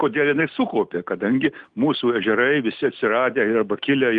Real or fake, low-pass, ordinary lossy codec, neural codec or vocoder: real; 9.9 kHz; Opus, 24 kbps; none